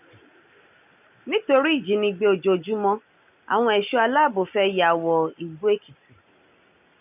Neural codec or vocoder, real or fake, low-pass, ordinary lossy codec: none; real; 3.6 kHz; none